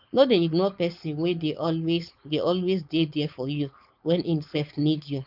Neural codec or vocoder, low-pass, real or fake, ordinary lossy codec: codec, 16 kHz, 4.8 kbps, FACodec; 5.4 kHz; fake; none